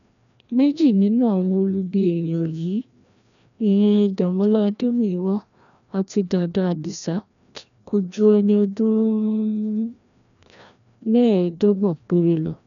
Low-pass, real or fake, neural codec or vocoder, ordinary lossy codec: 7.2 kHz; fake; codec, 16 kHz, 1 kbps, FreqCodec, larger model; none